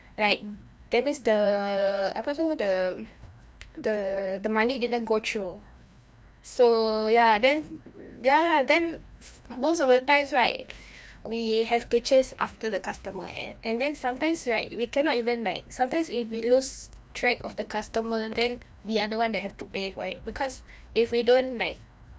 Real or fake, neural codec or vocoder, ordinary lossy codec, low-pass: fake; codec, 16 kHz, 1 kbps, FreqCodec, larger model; none; none